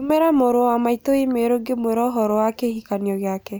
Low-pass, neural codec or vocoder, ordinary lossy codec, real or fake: none; none; none; real